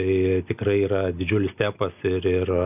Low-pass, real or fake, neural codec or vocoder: 3.6 kHz; real; none